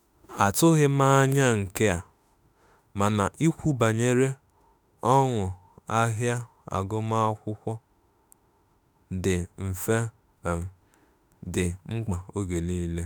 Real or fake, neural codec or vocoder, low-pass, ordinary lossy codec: fake; autoencoder, 48 kHz, 32 numbers a frame, DAC-VAE, trained on Japanese speech; none; none